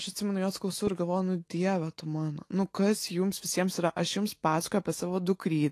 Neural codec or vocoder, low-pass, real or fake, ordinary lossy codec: autoencoder, 48 kHz, 128 numbers a frame, DAC-VAE, trained on Japanese speech; 14.4 kHz; fake; AAC, 48 kbps